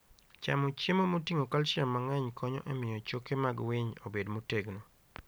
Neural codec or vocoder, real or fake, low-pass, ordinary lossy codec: none; real; none; none